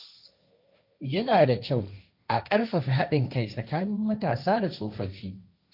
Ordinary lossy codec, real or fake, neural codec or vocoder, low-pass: none; fake; codec, 16 kHz, 1.1 kbps, Voila-Tokenizer; 5.4 kHz